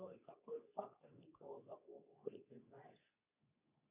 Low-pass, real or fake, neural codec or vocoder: 3.6 kHz; fake; codec, 24 kHz, 0.9 kbps, WavTokenizer, medium speech release version 2